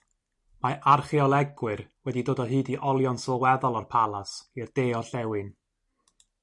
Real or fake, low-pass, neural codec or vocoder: real; 10.8 kHz; none